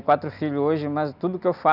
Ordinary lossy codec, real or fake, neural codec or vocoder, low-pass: none; real; none; 5.4 kHz